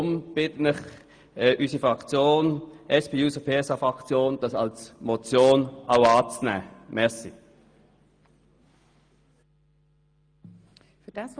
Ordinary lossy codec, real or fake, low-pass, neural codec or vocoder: Opus, 32 kbps; real; 9.9 kHz; none